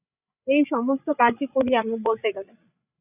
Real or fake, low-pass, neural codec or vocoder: fake; 3.6 kHz; codec, 16 kHz, 8 kbps, FreqCodec, larger model